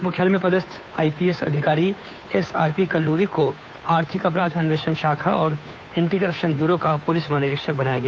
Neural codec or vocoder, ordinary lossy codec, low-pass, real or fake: codec, 16 kHz, 2 kbps, FunCodec, trained on Chinese and English, 25 frames a second; none; none; fake